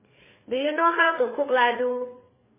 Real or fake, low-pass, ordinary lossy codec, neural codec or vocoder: fake; 3.6 kHz; MP3, 16 kbps; codec, 16 kHz, 4 kbps, FreqCodec, larger model